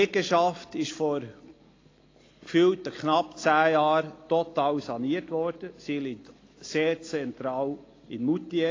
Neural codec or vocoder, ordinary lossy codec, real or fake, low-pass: none; AAC, 32 kbps; real; 7.2 kHz